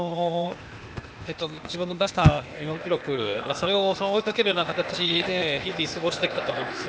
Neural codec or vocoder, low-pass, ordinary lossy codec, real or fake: codec, 16 kHz, 0.8 kbps, ZipCodec; none; none; fake